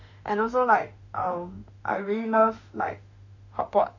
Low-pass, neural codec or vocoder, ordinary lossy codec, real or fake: 7.2 kHz; autoencoder, 48 kHz, 32 numbers a frame, DAC-VAE, trained on Japanese speech; none; fake